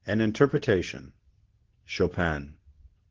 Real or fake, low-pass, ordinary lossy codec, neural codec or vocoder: fake; 7.2 kHz; Opus, 16 kbps; codec, 24 kHz, 0.9 kbps, WavTokenizer, medium speech release version 2